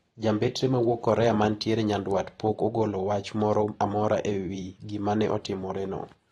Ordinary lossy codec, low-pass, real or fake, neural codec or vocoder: AAC, 32 kbps; 19.8 kHz; fake; vocoder, 44.1 kHz, 128 mel bands every 512 samples, BigVGAN v2